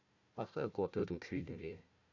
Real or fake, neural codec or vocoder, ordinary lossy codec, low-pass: fake; codec, 16 kHz, 1 kbps, FunCodec, trained on Chinese and English, 50 frames a second; none; 7.2 kHz